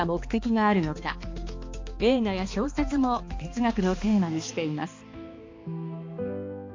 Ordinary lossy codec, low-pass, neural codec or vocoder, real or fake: MP3, 48 kbps; 7.2 kHz; codec, 16 kHz, 2 kbps, X-Codec, HuBERT features, trained on balanced general audio; fake